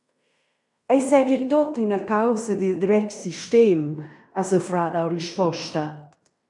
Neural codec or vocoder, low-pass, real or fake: codec, 16 kHz in and 24 kHz out, 0.9 kbps, LongCat-Audio-Codec, fine tuned four codebook decoder; 10.8 kHz; fake